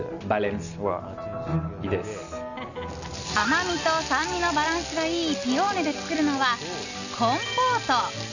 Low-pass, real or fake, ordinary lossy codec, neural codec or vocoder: 7.2 kHz; real; none; none